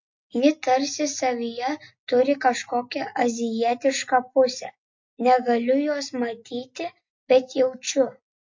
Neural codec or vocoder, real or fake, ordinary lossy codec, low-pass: none; real; MP3, 48 kbps; 7.2 kHz